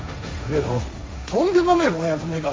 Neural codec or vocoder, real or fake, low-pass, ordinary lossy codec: codec, 16 kHz, 1.1 kbps, Voila-Tokenizer; fake; none; none